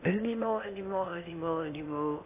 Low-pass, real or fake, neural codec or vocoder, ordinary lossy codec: 3.6 kHz; fake; codec, 16 kHz in and 24 kHz out, 0.8 kbps, FocalCodec, streaming, 65536 codes; AAC, 24 kbps